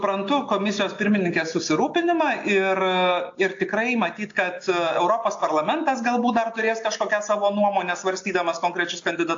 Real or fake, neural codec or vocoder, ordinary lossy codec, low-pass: real; none; AAC, 48 kbps; 7.2 kHz